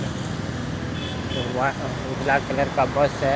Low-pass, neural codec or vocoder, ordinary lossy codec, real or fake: none; none; none; real